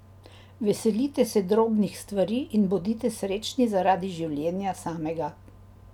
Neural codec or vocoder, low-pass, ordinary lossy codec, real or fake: none; 19.8 kHz; none; real